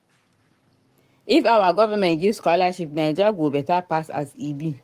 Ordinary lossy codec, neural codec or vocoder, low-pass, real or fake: Opus, 32 kbps; codec, 44.1 kHz, 7.8 kbps, Pupu-Codec; 14.4 kHz; fake